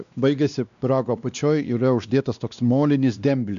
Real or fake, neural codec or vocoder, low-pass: fake; codec, 16 kHz, 2 kbps, X-Codec, WavLM features, trained on Multilingual LibriSpeech; 7.2 kHz